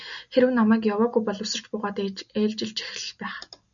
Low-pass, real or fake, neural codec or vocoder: 7.2 kHz; real; none